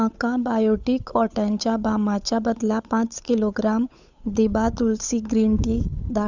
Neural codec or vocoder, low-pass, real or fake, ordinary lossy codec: codec, 16 kHz, 8 kbps, FunCodec, trained on Chinese and English, 25 frames a second; 7.2 kHz; fake; Opus, 64 kbps